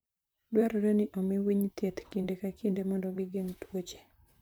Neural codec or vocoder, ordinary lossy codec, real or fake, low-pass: vocoder, 44.1 kHz, 128 mel bands, Pupu-Vocoder; none; fake; none